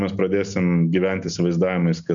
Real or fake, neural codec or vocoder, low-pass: real; none; 7.2 kHz